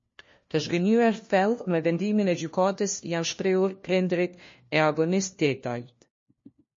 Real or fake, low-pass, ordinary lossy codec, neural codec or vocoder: fake; 7.2 kHz; MP3, 32 kbps; codec, 16 kHz, 1 kbps, FunCodec, trained on LibriTTS, 50 frames a second